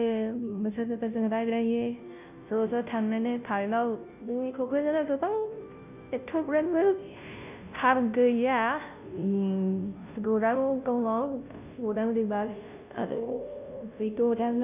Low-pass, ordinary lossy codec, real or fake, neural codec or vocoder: 3.6 kHz; none; fake; codec, 16 kHz, 0.5 kbps, FunCodec, trained on Chinese and English, 25 frames a second